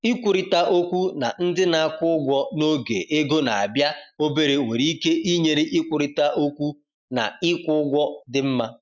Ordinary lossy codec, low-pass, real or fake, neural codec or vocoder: none; 7.2 kHz; real; none